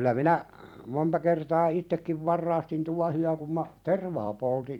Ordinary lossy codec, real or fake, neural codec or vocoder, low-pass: none; fake; vocoder, 44.1 kHz, 128 mel bands every 256 samples, BigVGAN v2; 19.8 kHz